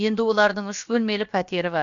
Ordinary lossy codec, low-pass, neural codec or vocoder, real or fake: none; 7.2 kHz; codec, 16 kHz, about 1 kbps, DyCAST, with the encoder's durations; fake